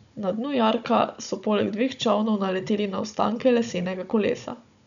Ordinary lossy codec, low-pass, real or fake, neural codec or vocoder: none; 7.2 kHz; fake; codec, 16 kHz, 16 kbps, FunCodec, trained on Chinese and English, 50 frames a second